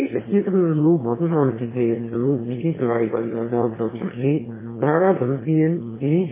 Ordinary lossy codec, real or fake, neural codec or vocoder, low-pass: MP3, 16 kbps; fake; autoencoder, 22.05 kHz, a latent of 192 numbers a frame, VITS, trained on one speaker; 3.6 kHz